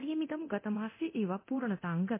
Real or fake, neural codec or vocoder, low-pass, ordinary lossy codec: fake; codec, 24 kHz, 0.9 kbps, DualCodec; 3.6 kHz; MP3, 24 kbps